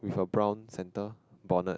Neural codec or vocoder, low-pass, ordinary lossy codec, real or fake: none; none; none; real